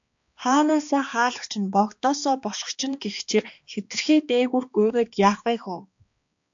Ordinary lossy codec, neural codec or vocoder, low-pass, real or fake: AAC, 64 kbps; codec, 16 kHz, 2 kbps, X-Codec, HuBERT features, trained on balanced general audio; 7.2 kHz; fake